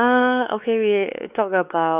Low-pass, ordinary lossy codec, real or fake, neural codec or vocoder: 3.6 kHz; none; fake; codec, 16 kHz, 4 kbps, X-Codec, WavLM features, trained on Multilingual LibriSpeech